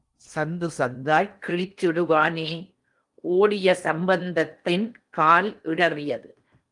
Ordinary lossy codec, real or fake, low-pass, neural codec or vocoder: Opus, 32 kbps; fake; 10.8 kHz; codec, 16 kHz in and 24 kHz out, 0.8 kbps, FocalCodec, streaming, 65536 codes